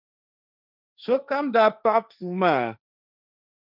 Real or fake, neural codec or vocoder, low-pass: fake; codec, 16 kHz, 1.1 kbps, Voila-Tokenizer; 5.4 kHz